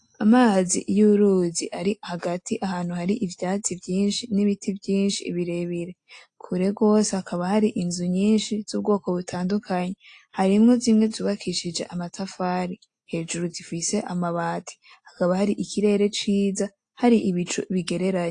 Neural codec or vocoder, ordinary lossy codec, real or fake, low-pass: none; AAC, 48 kbps; real; 9.9 kHz